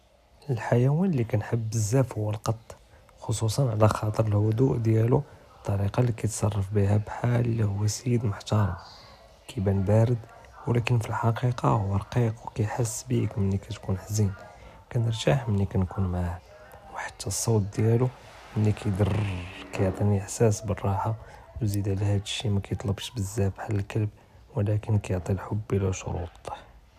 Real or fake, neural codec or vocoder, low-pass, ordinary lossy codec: real; none; 14.4 kHz; none